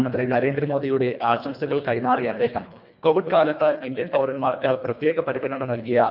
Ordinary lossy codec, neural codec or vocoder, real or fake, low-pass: none; codec, 24 kHz, 1.5 kbps, HILCodec; fake; 5.4 kHz